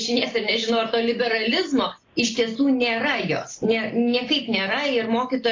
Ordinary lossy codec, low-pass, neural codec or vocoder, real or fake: AAC, 32 kbps; 7.2 kHz; none; real